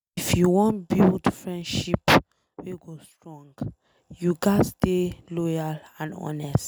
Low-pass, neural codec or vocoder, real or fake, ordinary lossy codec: none; none; real; none